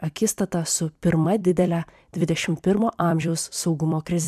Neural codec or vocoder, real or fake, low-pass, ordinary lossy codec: vocoder, 44.1 kHz, 128 mel bands every 256 samples, BigVGAN v2; fake; 14.4 kHz; MP3, 96 kbps